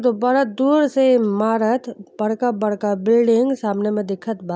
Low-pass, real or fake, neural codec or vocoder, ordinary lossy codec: none; real; none; none